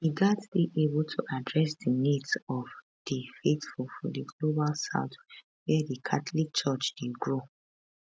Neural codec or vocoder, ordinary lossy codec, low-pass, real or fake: none; none; none; real